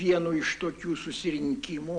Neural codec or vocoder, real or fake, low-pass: none; real; 9.9 kHz